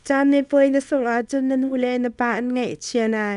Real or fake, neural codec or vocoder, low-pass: fake; codec, 24 kHz, 0.9 kbps, WavTokenizer, small release; 10.8 kHz